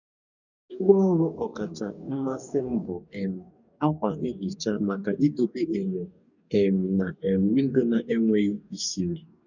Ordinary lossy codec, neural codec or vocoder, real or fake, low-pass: none; codec, 44.1 kHz, 2.6 kbps, DAC; fake; 7.2 kHz